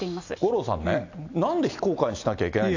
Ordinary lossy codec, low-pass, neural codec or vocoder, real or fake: AAC, 48 kbps; 7.2 kHz; none; real